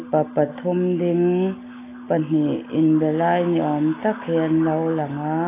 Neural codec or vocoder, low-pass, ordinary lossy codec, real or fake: none; 3.6 kHz; AAC, 16 kbps; real